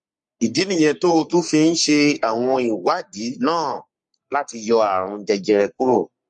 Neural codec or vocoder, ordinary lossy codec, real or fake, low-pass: codec, 44.1 kHz, 3.4 kbps, Pupu-Codec; MP3, 48 kbps; fake; 10.8 kHz